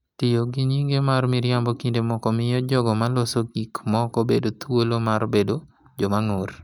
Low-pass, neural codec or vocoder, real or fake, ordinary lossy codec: 19.8 kHz; none; real; none